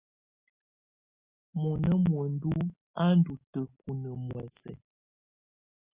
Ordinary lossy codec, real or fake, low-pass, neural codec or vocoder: Opus, 64 kbps; real; 3.6 kHz; none